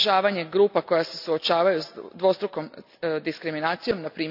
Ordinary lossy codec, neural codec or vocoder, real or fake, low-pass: none; none; real; 5.4 kHz